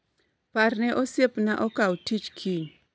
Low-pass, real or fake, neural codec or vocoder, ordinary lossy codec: none; real; none; none